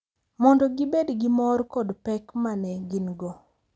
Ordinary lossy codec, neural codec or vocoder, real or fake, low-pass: none; none; real; none